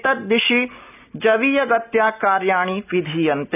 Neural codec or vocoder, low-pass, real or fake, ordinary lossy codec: none; 3.6 kHz; real; none